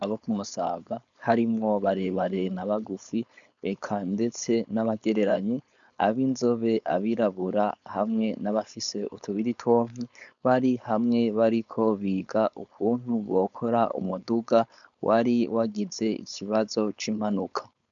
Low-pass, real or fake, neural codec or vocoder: 7.2 kHz; fake; codec, 16 kHz, 4.8 kbps, FACodec